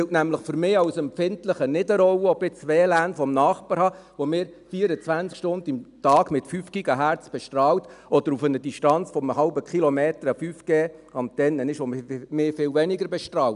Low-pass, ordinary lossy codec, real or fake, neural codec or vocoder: 10.8 kHz; none; real; none